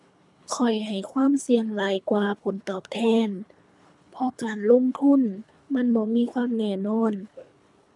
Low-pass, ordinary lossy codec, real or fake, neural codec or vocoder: 10.8 kHz; none; fake; codec, 24 kHz, 3 kbps, HILCodec